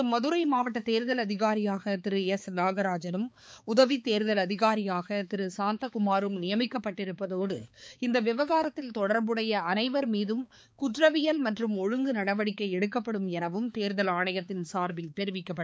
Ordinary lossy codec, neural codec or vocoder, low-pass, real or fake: none; codec, 16 kHz, 4 kbps, X-Codec, HuBERT features, trained on balanced general audio; none; fake